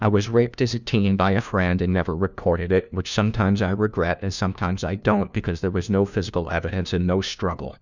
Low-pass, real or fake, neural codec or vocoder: 7.2 kHz; fake; codec, 16 kHz, 1 kbps, FunCodec, trained on LibriTTS, 50 frames a second